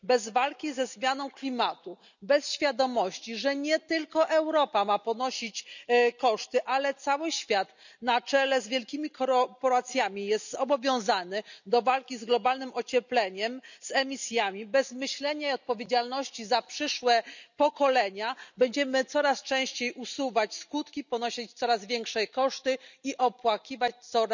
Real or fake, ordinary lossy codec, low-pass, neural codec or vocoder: real; none; 7.2 kHz; none